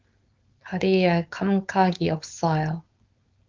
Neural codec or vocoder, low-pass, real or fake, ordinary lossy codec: codec, 16 kHz, 4.8 kbps, FACodec; 7.2 kHz; fake; Opus, 24 kbps